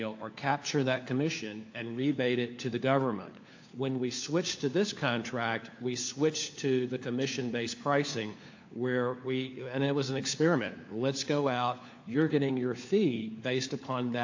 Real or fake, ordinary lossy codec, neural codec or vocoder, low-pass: fake; AAC, 48 kbps; codec, 16 kHz, 4 kbps, FunCodec, trained on LibriTTS, 50 frames a second; 7.2 kHz